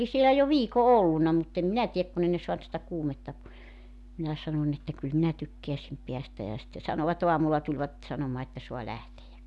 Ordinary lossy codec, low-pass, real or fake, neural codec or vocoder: none; none; real; none